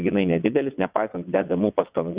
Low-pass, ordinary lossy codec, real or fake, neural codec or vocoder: 3.6 kHz; Opus, 64 kbps; fake; vocoder, 22.05 kHz, 80 mel bands, WaveNeXt